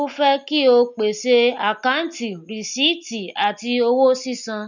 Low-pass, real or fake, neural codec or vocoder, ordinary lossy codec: 7.2 kHz; real; none; none